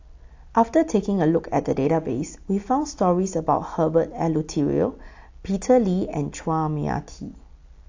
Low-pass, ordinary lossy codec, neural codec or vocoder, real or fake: 7.2 kHz; AAC, 48 kbps; none; real